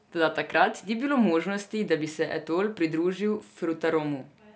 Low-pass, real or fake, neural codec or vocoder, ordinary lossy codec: none; real; none; none